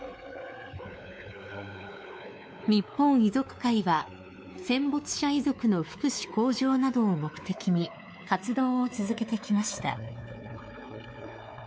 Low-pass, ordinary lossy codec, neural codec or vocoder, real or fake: none; none; codec, 16 kHz, 4 kbps, X-Codec, WavLM features, trained on Multilingual LibriSpeech; fake